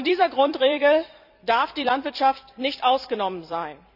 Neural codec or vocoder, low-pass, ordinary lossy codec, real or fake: vocoder, 44.1 kHz, 128 mel bands every 256 samples, BigVGAN v2; 5.4 kHz; none; fake